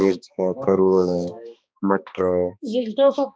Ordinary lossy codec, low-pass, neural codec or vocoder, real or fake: none; none; codec, 16 kHz, 2 kbps, X-Codec, HuBERT features, trained on balanced general audio; fake